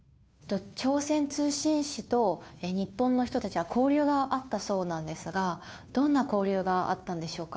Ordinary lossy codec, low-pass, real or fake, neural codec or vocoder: none; none; fake; codec, 16 kHz, 2 kbps, FunCodec, trained on Chinese and English, 25 frames a second